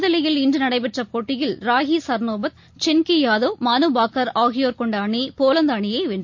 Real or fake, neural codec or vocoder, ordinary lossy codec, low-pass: real; none; MP3, 48 kbps; 7.2 kHz